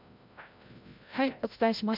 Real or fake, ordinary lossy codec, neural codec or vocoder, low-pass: fake; MP3, 48 kbps; codec, 16 kHz, 0.5 kbps, FreqCodec, larger model; 5.4 kHz